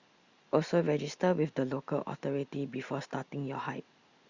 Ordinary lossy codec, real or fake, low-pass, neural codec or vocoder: Opus, 64 kbps; real; 7.2 kHz; none